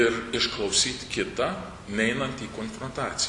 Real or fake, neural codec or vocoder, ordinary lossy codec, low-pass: real; none; MP3, 64 kbps; 10.8 kHz